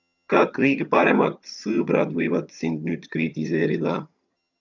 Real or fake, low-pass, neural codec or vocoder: fake; 7.2 kHz; vocoder, 22.05 kHz, 80 mel bands, HiFi-GAN